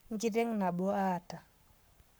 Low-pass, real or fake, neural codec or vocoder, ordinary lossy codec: none; fake; codec, 44.1 kHz, 7.8 kbps, Pupu-Codec; none